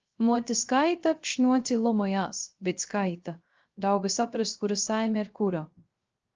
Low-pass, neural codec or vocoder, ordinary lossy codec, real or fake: 7.2 kHz; codec, 16 kHz, 0.3 kbps, FocalCodec; Opus, 24 kbps; fake